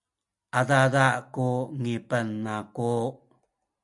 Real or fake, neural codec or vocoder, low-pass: real; none; 10.8 kHz